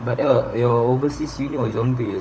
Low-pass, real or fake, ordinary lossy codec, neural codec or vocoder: none; fake; none; codec, 16 kHz, 16 kbps, FunCodec, trained on LibriTTS, 50 frames a second